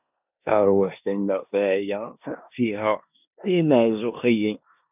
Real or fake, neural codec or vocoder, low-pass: fake; codec, 16 kHz in and 24 kHz out, 0.9 kbps, LongCat-Audio-Codec, four codebook decoder; 3.6 kHz